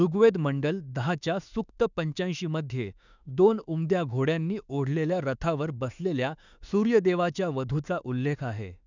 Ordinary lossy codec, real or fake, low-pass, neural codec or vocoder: none; fake; 7.2 kHz; autoencoder, 48 kHz, 32 numbers a frame, DAC-VAE, trained on Japanese speech